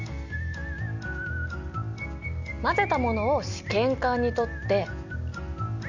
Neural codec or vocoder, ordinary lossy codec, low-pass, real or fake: none; none; 7.2 kHz; real